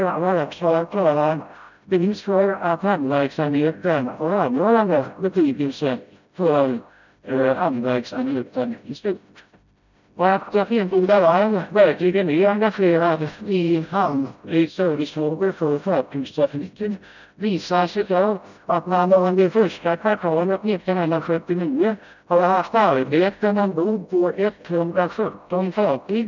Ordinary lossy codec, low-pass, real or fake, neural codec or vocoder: none; 7.2 kHz; fake; codec, 16 kHz, 0.5 kbps, FreqCodec, smaller model